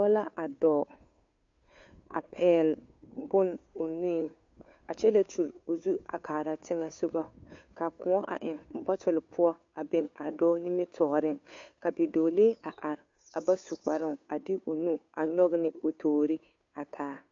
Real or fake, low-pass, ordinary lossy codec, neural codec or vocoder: fake; 7.2 kHz; MP3, 48 kbps; codec, 16 kHz, 2 kbps, FunCodec, trained on Chinese and English, 25 frames a second